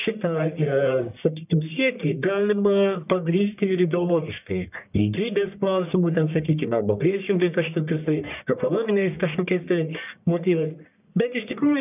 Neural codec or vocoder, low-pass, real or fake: codec, 44.1 kHz, 1.7 kbps, Pupu-Codec; 3.6 kHz; fake